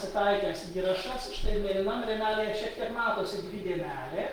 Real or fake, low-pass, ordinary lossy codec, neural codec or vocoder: real; 19.8 kHz; Opus, 24 kbps; none